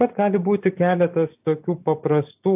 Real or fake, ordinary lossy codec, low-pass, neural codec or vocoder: real; AAC, 32 kbps; 3.6 kHz; none